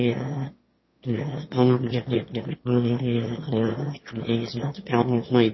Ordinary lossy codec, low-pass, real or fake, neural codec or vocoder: MP3, 24 kbps; 7.2 kHz; fake; autoencoder, 22.05 kHz, a latent of 192 numbers a frame, VITS, trained on one speaker